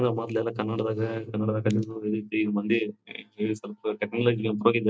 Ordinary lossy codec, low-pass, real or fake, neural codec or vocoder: none; none; real; none